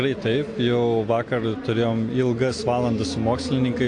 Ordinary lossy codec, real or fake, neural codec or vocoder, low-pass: AAC, 48 kbps; real; none; 9.9 kHz